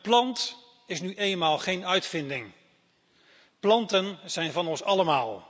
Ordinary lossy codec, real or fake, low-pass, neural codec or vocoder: none; real; none; none